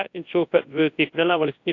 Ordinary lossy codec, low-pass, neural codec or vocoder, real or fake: AAC, 48 kbps; 7.2 kHz; codec, 24 kHz, 0.9 kbps, WavTokenizer, large speech release; fake